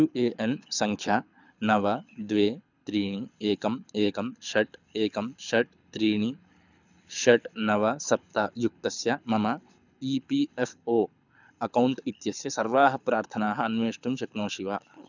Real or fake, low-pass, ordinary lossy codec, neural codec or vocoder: fake; 7.2 kHz; none; codec, 24 kHz, 6 kbps, HILCodec